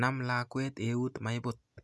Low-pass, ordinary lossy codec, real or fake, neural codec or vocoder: none; none; real; none